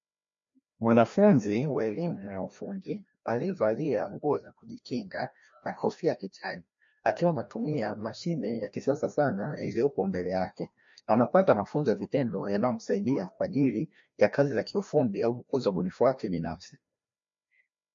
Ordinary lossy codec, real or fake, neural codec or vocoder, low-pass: MP3, 48 kbps; fake; codec, 16 kHz, 1 kbps, FreqCodec, larger model; 7.2 kHz